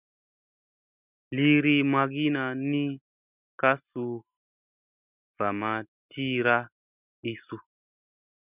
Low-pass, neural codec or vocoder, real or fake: 3.6 kHz; none; real